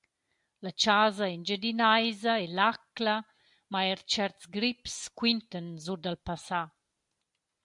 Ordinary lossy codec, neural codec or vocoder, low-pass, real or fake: MP3, 64 kbps; none; 10.8 kHz; real